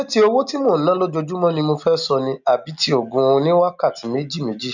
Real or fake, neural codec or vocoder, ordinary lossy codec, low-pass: real; none; none; 7.2 kHz